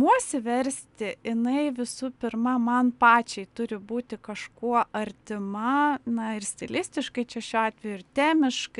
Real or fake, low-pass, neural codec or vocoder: real; 10.8 kHz; none